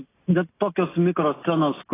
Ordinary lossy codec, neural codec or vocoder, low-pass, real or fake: AAC, 16 kbps; none; 3.6 kHz; real